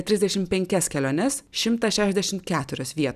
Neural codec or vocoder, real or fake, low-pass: none; real; 14.4 kHz